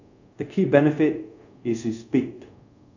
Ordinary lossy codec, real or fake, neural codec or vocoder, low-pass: none; fake; codec, 24 kHz, 0.5 kbps, DualCodec; 7.2 kHz